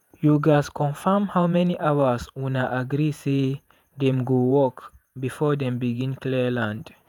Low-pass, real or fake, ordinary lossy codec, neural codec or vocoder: 19.8 kHz; fake; none; vocoder, 48 kHz, 128 mel bands, Vocos